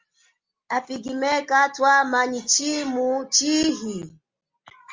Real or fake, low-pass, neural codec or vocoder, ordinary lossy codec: real; 7.2 kHz; none; Opus, 32 kbps